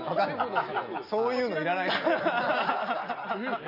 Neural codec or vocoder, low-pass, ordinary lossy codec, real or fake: none; 5.4 kHz; none; real